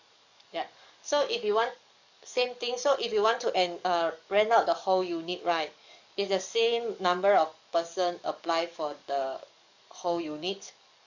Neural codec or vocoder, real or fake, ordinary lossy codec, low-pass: codec, 44.1 kHz, 7.8 kbps, DAC; fake; none; 7.2 kHz